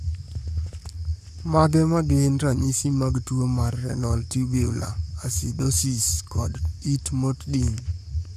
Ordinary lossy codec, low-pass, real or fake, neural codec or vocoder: none; 14.4 kHz; fake; codec, 44.1 kHz, 7.8 kbps, Pupu-Codec